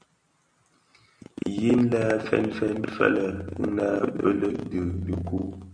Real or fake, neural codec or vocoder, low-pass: real; none; 9.9 kHz